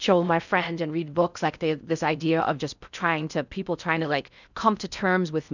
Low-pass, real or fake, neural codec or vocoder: 7.2 kHz; fake; codec, 16 kHz in and 24 kHz out, 0.6 kbps, FocalCodec, streaming, 4096 codes